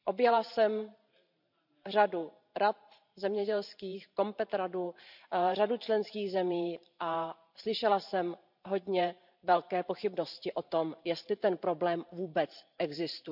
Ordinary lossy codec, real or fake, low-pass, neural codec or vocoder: none; fake; 5.4 kHz; vocoder, 44.1 kHz, 128 mel bands every 512 samples, BigVGAN v2